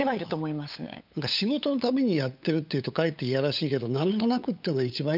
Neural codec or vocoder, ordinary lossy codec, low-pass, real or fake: codec, 16 kHz, 8 kbps, FunCodec, trained on LibriTTS, 25 frames a second; none; 5.4 kHz; fake